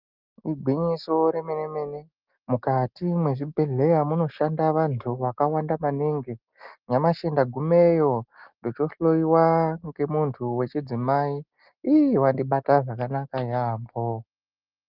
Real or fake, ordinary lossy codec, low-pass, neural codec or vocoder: real; Opus, 32 kbps; 5.4 kHz; none